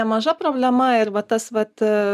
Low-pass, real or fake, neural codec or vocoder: 14.4 kHz; real; none